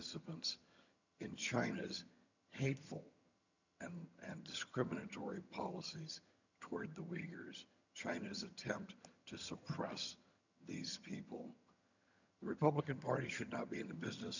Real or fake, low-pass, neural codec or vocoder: fake; 7.2 kHz; vocoder, 22.05 kHz, 80 mel bands, HiFi-GAN